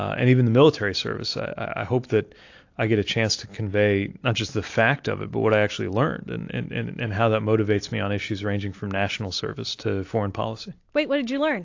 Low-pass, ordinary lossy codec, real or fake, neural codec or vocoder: 7.2 kHz; AAC, 48 kbps; real; none